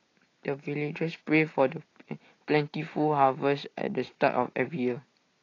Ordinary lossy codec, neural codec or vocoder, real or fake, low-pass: AAC, 32 kbps; none; real; 7.2 kHz